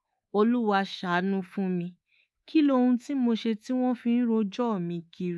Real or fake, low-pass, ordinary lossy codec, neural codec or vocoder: fake; none; none; codec, 24 kHz, 3.1 kbps, DualCodec